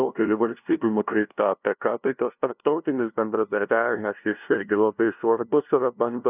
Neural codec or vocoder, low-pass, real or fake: codec, 16 kHz, 0.5 kbps, FunCodec, trained on LibriTTS, 25 frames a second; 3.6 kHz; fake